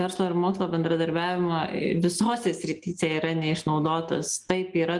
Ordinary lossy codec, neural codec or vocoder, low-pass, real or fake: Opus, 32 kbps; none; 10.8 kHz; real